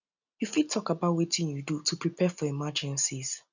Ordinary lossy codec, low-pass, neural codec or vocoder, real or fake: none; 7.2 kHz; none; real